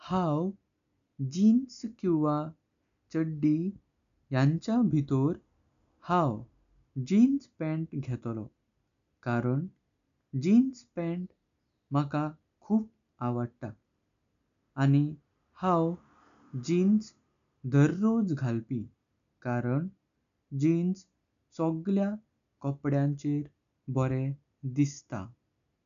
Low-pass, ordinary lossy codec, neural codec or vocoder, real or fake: 7.2 kHz; none; none; real